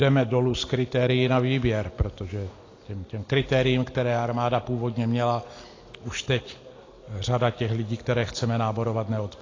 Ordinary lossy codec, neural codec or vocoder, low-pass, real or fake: AAC, 32 kbps; none; 7.2 kHz; real